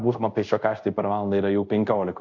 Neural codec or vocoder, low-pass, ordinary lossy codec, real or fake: codec, 24 kHz, 0.5 kbps, DualCodec; 7.2 kHz; AAC, 48 kbps; fake